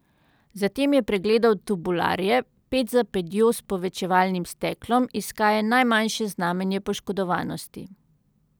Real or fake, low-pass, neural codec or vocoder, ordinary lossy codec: real; none; none; none